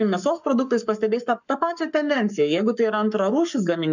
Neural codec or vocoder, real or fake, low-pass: codec, 44.1 kHz, 7.8 kbps, Pupu-Codec; fake; 7.2 kHz